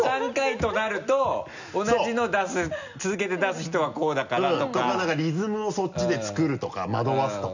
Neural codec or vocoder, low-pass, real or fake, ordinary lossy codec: none; 7.2 kHz; real; none